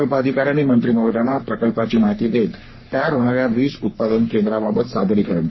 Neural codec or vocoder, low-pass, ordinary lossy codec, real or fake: codec, 44.1 kHz, 3.4 kbps, Pupu-Codec; 7.2 kHz; MP3, 24 kbps; fake